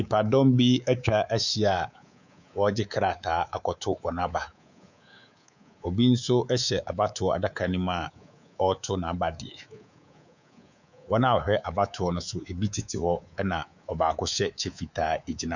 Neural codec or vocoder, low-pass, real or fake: codec, 24 kHz, 3.1 kbps, DualCodec; 7.2 kHz; fake